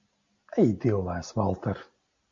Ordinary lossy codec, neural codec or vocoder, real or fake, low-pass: MP3, 48 kbps; none; real; 7.2 kHz